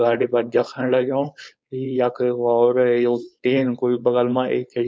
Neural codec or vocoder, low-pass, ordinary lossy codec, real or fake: codec, 16 kHz, 4.8 kbps, FACodec; none; none; fake